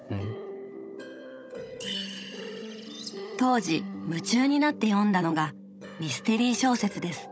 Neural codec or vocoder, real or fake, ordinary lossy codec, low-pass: codec, 16 kHz, 16 kbps, FunCodec, trained on Chinese and English, 50 frames a second; fake; none; none